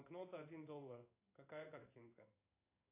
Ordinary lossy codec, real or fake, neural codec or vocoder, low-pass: AAC, 32 kbps; fake; codec, 16 kHz in and 24 kHz out, 1 kbps, XY-Tokenizer; 3.6 kHz